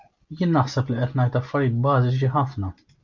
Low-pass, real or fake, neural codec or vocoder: 7.2 kHz; real; none